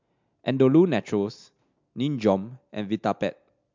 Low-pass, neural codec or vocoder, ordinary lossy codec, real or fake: 7.2 kHz; none; MP3, 64 kbps; real